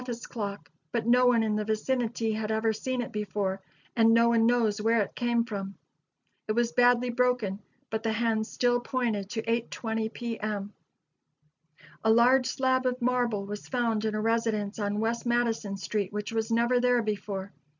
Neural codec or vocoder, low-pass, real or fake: none; 7.2 kHz; real